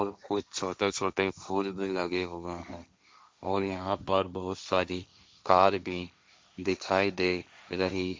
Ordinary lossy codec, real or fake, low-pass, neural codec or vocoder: none; fake; none; codec, 16 kHz, 1.1 kbps, Voila-Tokenizer